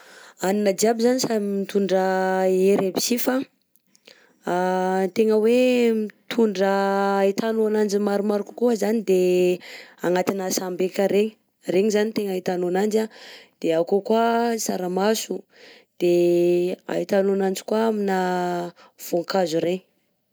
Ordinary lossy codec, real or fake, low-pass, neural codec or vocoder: none; real; none; none